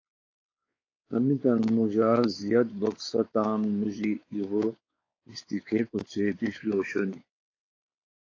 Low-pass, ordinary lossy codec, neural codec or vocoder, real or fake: 7.2 kHz; AAC, 32 kbps; codec, 16 kHz, 4 kbps, X-Codec, WavLM features, trained on Multilingual LibriSpeech; fake